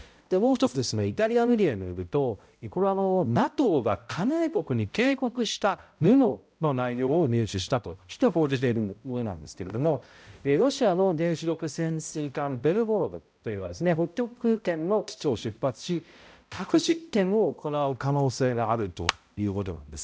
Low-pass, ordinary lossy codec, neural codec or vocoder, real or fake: none; none; codec, 16 kHz, 0.5 kbps, X-Codec, HuBERT features, trained on balanced general audio; fake